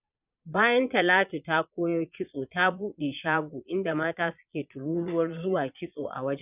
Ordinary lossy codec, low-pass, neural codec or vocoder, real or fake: none; 3.6 kHz; vocoder, 22.05 kHz, 80 mel bands, Vocos; fake